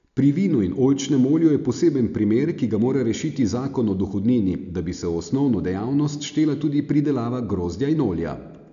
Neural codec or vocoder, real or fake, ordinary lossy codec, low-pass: none; real; none; 7.2 kHz